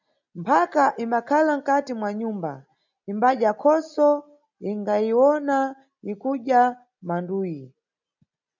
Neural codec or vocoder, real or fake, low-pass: none; real; 7.2 kHz